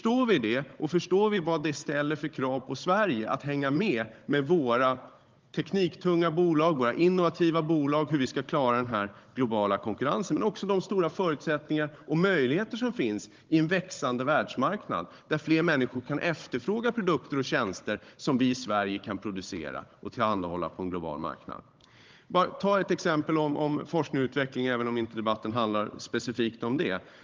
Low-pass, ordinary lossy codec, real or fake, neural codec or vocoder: 7.2 kHz; Opus, 32 kbps; fake; codec, 16 kHz, 16 kbps, FunCodec, trained on Chinese and English, 50 frames a second